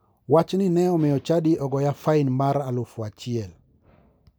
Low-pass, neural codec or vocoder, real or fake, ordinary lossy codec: none; none; real; none